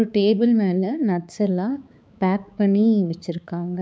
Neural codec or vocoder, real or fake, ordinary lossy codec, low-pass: codec, 16 kHz, 4 kbps, X-Codec, HuBERT features, trained on balanced general audio; fake; none; none